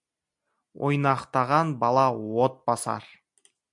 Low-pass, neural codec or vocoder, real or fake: 10.8 kHz; none; real